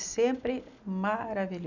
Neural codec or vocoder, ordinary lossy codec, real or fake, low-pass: none; none; real; 7.2 kHz